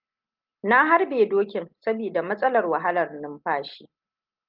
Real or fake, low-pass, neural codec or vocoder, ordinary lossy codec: real; 5.4 kHz; none; Opus, 32 kbps